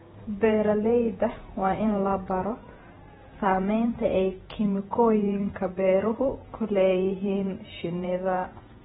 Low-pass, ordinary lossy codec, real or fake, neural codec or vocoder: 19.8 kHz; AAC, 16 kbps; fake; vocoder, 48 kHz, 128 mel bands, Vocos